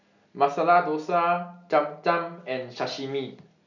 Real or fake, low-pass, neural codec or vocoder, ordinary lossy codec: real; 7.2 kHz; none; none